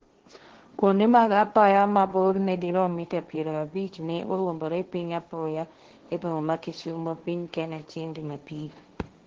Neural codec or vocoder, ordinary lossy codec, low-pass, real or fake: codec, 16 kHz, 1.1 kbps, Voila-Tokenizer; Opus, 16 kbps; 7.2 kHz; fake